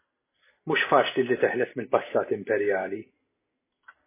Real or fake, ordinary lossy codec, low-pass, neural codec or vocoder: real; MP3, 16 kbps; 3.6 kHz; none